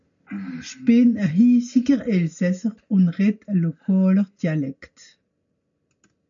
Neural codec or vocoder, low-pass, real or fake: none; 7.2 kHz; real